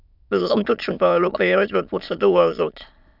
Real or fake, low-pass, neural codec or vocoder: fake; 5.4 kHz; autoencoder, 22.05 kHz, a latent of 192 numbers a frame, VITS, trained on many speakers